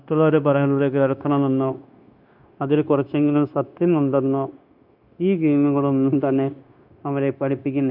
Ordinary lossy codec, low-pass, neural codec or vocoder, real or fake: none; 5.4 kHz; codec, 16 kHz, 0.9 kbps, LongCat-Audio-Codec; fake